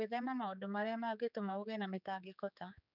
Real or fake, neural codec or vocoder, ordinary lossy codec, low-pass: fake; codec, 16 kHz, 4 kbps, X-Codec, HuBERT features, trained on general audio; none; 5.4 kHz